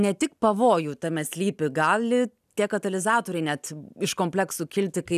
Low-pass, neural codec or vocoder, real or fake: 14.4 kHz; none; real